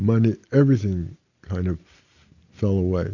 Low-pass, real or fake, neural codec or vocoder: 7.2 kHz; real; none